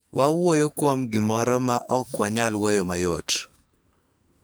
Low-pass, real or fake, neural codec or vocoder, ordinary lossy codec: none; fake; codec, 44.1 kHz, 2.6 kbps, SNAC; none